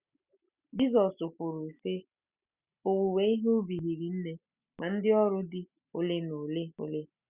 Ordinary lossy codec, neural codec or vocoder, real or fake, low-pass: Opus, 64 kbps; none; real; 3.6 kHz